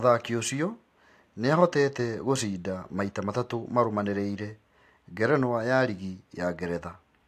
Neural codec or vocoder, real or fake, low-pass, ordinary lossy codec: none; real; 14.4 kHz; AAC, 64 kbps